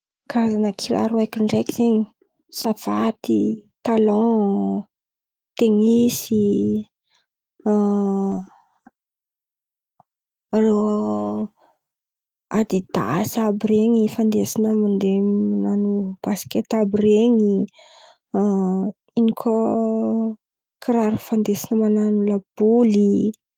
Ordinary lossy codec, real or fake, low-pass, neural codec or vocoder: Opus, 24 kbps; fake; 19.8 kHz; autoencoder, 48 kHz, 128 numbers a frame, DAC-VAE, trained on Japanese speech